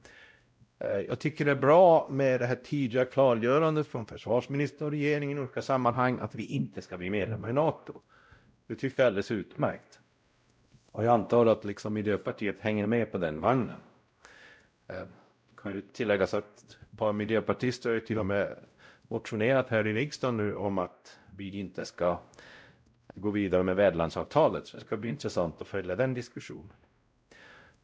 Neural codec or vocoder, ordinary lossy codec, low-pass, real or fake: codec, 16 kHz, 0.5 kbps, X-Codec, WavLM features, trained on Multilingual LibriSpeech; none; none; fake